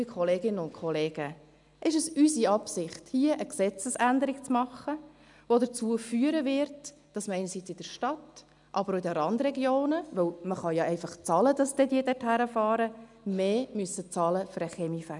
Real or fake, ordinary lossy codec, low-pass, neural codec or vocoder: real; none; 10.8 kHz; none